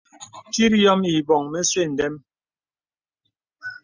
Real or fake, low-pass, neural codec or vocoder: real; 7.2 kHz; none